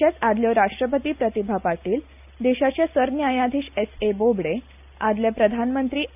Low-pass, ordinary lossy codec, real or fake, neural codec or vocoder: 3.6 kHz; none; real; none